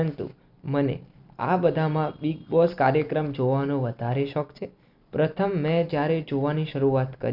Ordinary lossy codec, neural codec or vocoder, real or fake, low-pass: none; vocoder, 44.1 kHz, 128 mel bands every 256 samples, BigVGAN v2; fake; 5.4 kHz